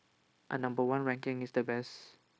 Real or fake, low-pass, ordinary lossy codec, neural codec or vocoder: fake; none; none; codec, 16 kHz, 0.9 kbps, LongCat-Audio-Codec